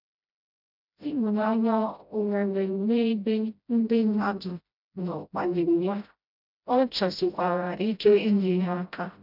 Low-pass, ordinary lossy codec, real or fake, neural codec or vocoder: 5.4 kHz; none; fake; codec, 16 kHz, 0.5 kbps, FreqCodec, smaller model